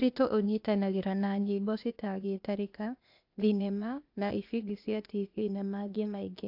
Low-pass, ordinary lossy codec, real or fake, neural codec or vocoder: 5.4 kHz; AAC, 48 kbps; fake; codec, 16 kHz, 0.8 kbps, ZipCodec